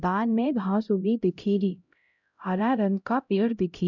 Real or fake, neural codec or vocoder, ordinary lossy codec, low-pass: fake; codec, 16 kHz, 0.5 kbps, X-Codec, HuBERT features, trained on LibriSpeech; none; 7.2 kHz